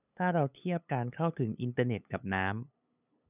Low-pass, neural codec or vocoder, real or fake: 3.6 kHz; codec, 16 kHz, 8 kbps, FunCodec, trained on LibriTTS, 25 frames a second; fake